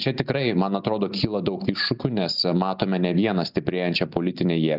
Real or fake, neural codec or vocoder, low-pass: real; none; 5.4 kHz